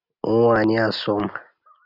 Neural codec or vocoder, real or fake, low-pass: none; real; 5.4 kHz